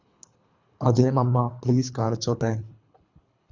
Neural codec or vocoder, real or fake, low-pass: codec, 24 kHz, 3 kbps, HILCodec; fake; 7.2 kHz